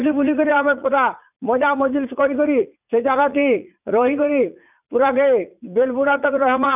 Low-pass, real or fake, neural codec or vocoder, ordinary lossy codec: 3.6 kHz; fake; vocoder, 44.1 kHz, 80 mel bands, Vocos; none